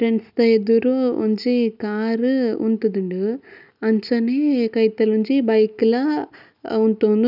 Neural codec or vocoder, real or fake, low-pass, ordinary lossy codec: none; real; 5.4 kHz; none